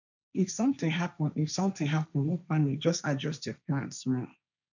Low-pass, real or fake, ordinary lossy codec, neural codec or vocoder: 7.2 kHz; fake; none; codec, 16 kHz, 1.1 kbps, Voila-Tokenizer